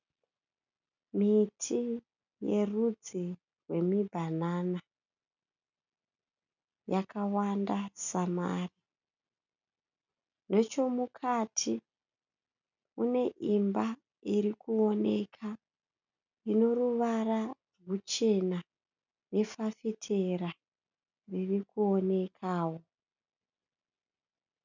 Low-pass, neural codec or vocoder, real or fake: 7.2 kHz; none; real